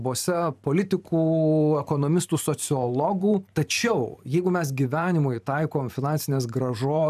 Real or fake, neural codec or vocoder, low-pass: fake; vocoder, 44.1 kHz, 128 mel bands every 512 samples, BigVGAN v2; 14.4 kHz